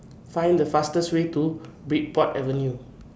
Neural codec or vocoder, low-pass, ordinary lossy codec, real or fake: none; none; none; real